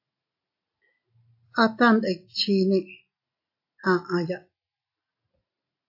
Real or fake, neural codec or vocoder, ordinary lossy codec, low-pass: real; none; AAC, 32 kbps; 5.4 kHz